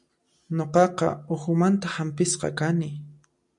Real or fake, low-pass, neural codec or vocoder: real; 10.8 kHz; none